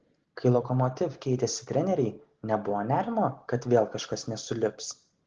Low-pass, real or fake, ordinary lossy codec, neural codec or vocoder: 7.2 kHz; real; Opus, 16 kbps; none